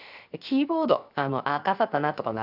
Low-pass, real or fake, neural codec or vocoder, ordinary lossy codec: 5.4 kHz; fake; codec, 16 kHz, 0.7 kbps, FocalCodec; none